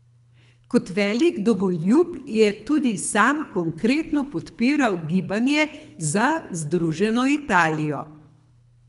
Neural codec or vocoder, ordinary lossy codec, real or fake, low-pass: codec, 24 kHz, 3 kbps, HILCodec; none; fake; 10.8 kHz